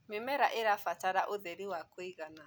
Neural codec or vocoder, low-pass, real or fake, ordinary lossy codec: none; none; real; none